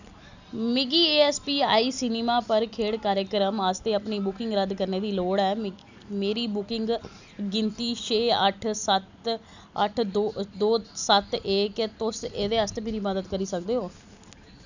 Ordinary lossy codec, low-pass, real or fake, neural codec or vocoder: none; 7.2 kHz; real; none